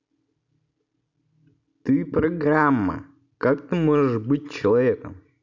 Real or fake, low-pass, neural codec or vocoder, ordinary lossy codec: real; 7.2 kHz; none; none